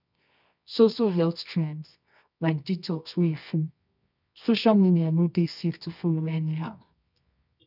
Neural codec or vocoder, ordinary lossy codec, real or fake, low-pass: codec, 24 kHz, 0.9 kbps, WavTokenizer, medium music audio release; none; fake; 5.4 kHz